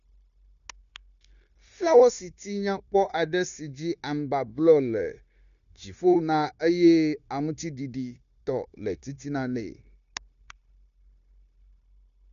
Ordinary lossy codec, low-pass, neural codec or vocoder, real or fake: none; 7.2 kHz; codec, 16 kHz, 0.9 kbps, LongCat-Audio-Codec; fake